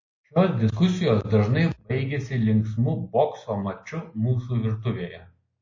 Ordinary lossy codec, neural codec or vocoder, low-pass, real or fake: MP3, 32 kbps; none; 7.2 kHz; real